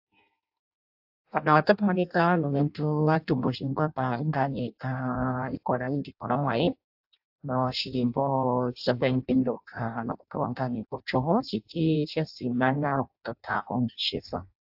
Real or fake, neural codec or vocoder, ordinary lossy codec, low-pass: fake; codec, 16 kHz in and 24 kHz out, 0.6 kbps, FireRedTTS-2 codec; AAC, 48 kbps; 5.4 kHz